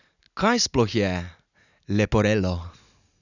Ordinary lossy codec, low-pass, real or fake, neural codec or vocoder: none; 7.2 kHz; real; none